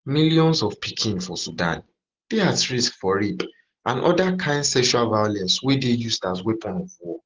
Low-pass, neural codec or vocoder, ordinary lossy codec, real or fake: 7.2 kHz; none; Opus, 16 kbps; real